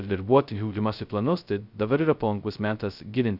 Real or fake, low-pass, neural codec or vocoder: fake; 5.4 kHz; codec, 16 kHz, 0.2 kbps, FocalCodec